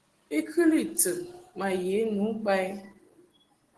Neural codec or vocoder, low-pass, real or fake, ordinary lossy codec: none; 10.8 kHz; real; Opus, 16 kbps